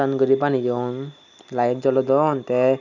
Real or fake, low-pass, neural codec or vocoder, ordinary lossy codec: real; 7.2 kHz; none; none